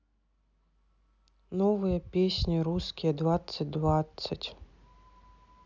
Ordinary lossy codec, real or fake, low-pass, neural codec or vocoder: none; real; 7.2 kHz; none